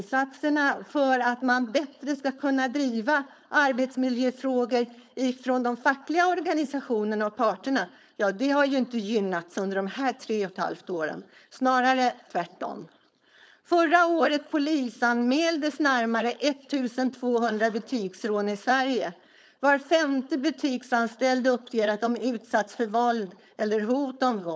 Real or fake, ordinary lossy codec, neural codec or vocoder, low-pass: fake; none; codec, 16 kHz, 4.8 kbps, FACodec; none